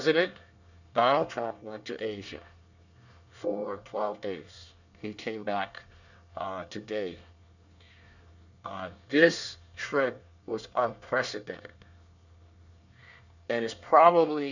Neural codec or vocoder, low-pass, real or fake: codec, 24 kHz, 1 kbps, SNAC; 7.2 kHz; fake